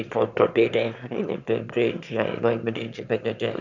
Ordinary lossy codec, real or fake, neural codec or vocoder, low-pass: none; fake; autoencoder, 22.05 kHz, a latent of 192 numbers a frame, VITS, trained on one speaker; 7.2 kHz